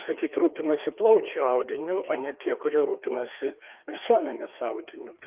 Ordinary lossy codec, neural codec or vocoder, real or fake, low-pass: Opus, 32 kbps; codec, 16 kHz, 2 kbps, FreqCodec, larger model; fake; 3.6 kHz